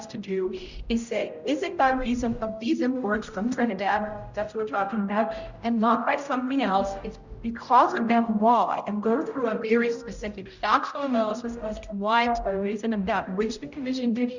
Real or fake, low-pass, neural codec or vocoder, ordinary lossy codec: fake; 7.2 kHz; codec, 16 kHz, 0.5 kbps, X-Codec, HuBERT features, trained on general audio; Opus, 64 kbps